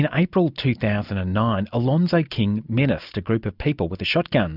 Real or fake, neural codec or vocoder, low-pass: real; none; 5.4 kHz